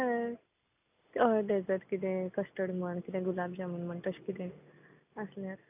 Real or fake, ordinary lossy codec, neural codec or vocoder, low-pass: real; AAC, 32 kbps; none; 3.6 kHz